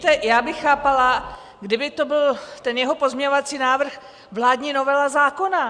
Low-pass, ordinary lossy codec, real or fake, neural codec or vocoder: 9.9 kHz; Opus, 64 kbps; real; none